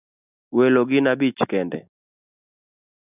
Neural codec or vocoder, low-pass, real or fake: none; 3.6 kHz; real